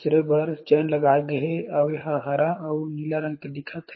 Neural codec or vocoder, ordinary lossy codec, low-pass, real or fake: codec, 24 kHz, 6 kbps, HILCodec; MP3, 24 kbps; 7.2 kHz; fake